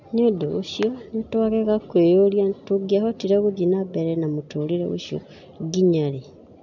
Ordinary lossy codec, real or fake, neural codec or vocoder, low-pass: none; real; none; 7.2 kHz